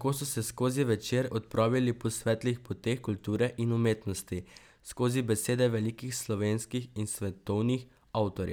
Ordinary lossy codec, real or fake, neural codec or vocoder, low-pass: none; real; none; none